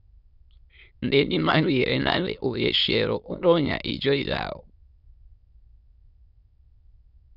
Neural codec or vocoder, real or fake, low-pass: autoencoder, 22.05 kHz, a latent of 192 numbers a frame, VITS, trained on many speakers; fake; 5.4 kHz